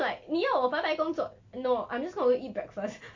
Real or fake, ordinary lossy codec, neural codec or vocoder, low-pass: real; AAC, 48 kbps; none; 7.2 kHz